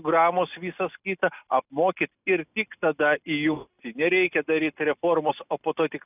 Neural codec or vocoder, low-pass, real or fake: none; 3.6 kHz; real